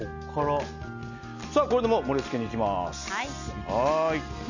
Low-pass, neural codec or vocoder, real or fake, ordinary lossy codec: 7.2 kHz; none; real; none